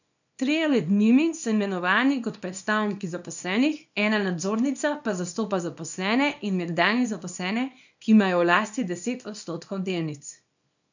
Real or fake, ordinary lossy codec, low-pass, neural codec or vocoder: fake; none; 7.2 kHz; codec, 24 kHz, 0.9 kbps, WavTokenizer, small release